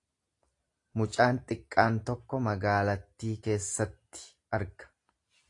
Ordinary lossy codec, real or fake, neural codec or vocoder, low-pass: AAC, 48 kbps; real; none; 10.8 kHz